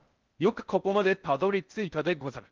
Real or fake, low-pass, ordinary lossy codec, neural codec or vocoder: fake; 7.2 kHz; Opus, 32 kbps; codec, 16 kHz in and 24 kHz out, 0.6 kbps, FocalCodec, streaming, 4096 codes